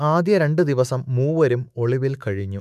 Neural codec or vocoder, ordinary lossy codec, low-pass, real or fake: autoencoder, 48 kHz, 128 numbers a frame, DAC-VAE, trained on Japanese speech; none; 14.4 kHz; fake